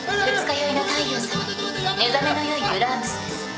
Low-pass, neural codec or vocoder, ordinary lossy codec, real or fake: none; none; none; real